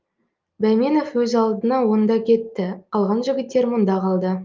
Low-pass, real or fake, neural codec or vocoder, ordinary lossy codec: 7.2 kHz; real; none; Opus, 24 kbps